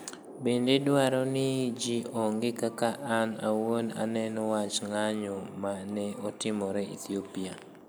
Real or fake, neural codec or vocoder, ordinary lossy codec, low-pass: real; none; none; none